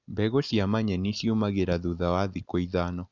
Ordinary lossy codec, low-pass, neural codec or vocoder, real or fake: none; 7.2 kHz; none; real